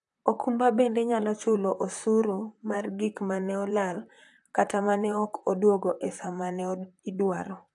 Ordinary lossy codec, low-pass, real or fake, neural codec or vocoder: AAC, 64 kbps; 10.8 kHz; fake; vocoder, 44.1 kHz, 128 mel bands, Pupu-Vocoder